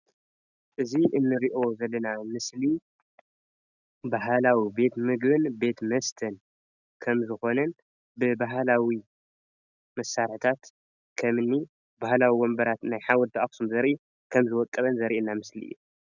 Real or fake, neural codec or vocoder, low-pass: real; none; 7.2 kHz